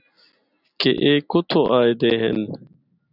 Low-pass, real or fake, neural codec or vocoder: 5.4 kHz; real; none